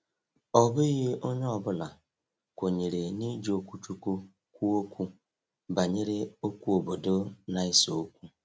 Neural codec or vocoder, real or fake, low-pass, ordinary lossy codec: none; real; none; none